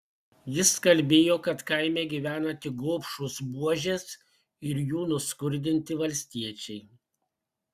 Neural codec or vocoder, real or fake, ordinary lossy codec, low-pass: none; real; Opus, 64 kbps; 14.4 kHz